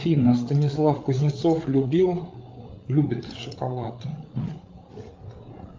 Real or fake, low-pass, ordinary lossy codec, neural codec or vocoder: fake; 7.2 kHz; Opus, 24 kbps; codec, 16 kHz, 8 kbps, FreqCodec, larger model